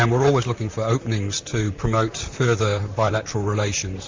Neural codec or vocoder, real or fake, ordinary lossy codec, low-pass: vocoder, 44.1 kHz, 128 mel bands, Pupu-Vocoder; fake; MP3, 64 kbps; 7.2 kHz